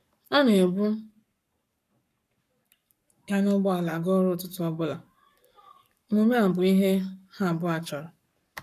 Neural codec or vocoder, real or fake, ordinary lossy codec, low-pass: codec, 44.1 kHz, 7.8 kbps, Pupu-Codec; fake; none; 14.4 kHz